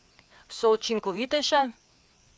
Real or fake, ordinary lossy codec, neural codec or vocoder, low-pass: fake; none; codec, 16 kHz, 4 kbps, FreqCodec, larger model; none